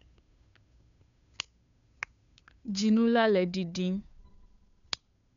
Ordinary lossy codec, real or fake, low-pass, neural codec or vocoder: none; fake; 7.2 kHz; codec, 16 kHz, 2 kbps, FunCodec, trained on Chinese and English, 25 frames a second